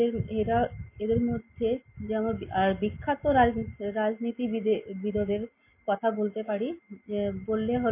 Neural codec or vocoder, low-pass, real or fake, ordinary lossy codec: none; 3.6 kHz; real; MP3, 24 kbps